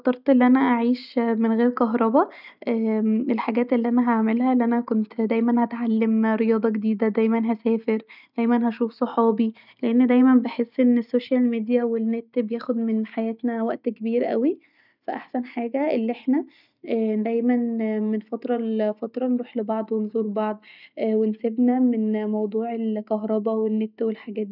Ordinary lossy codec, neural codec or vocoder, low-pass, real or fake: none; none; 5.4 kHz; real